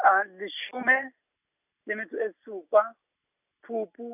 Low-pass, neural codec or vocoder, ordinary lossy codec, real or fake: 3.6 kHz; none; none; real